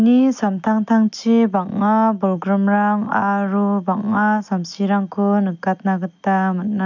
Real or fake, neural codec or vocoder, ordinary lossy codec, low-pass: real; none; none; 7.2 kHz